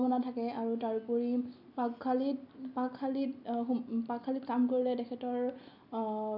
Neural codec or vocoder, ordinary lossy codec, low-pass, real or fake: none; none; 5.4 kHz; real